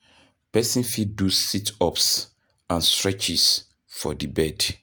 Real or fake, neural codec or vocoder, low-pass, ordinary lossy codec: real; none; none; none